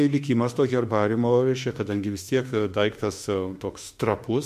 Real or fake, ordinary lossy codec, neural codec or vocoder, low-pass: fake; MP3, 64 kbps; autoencoder, 48 kHz, 32 numbers a frame, DAC-VAE, trained on Japanese speech; 14.4 kHz